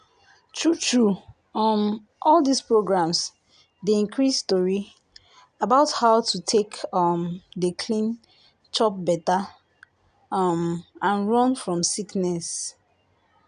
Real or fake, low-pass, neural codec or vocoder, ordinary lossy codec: real; 9.9 kHz; none; none